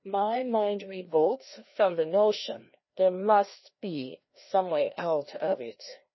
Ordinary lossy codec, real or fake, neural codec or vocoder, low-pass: MP3, 24 kbps; fake; codec, 16 kHz, 1 kbps, FreqCodec, larger model; 7.2 kHz